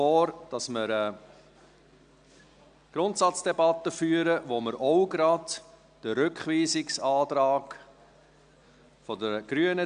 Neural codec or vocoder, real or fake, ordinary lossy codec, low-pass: none; real; none; 9.9 kHz